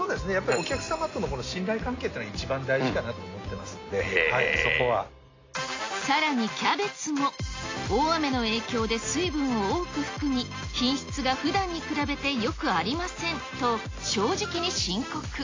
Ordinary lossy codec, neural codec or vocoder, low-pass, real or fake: AAC, 32 kbps; none; 7.2 kHz; real